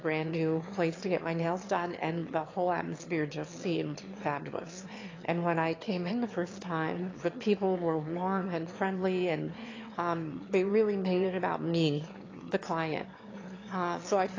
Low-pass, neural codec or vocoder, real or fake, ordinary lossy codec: 7.2 kHz; autoencoder, 22.05 kHz, a latent of 192 numbers a frame, VITS, trained on one speaker; fake; AAC, 32 kbps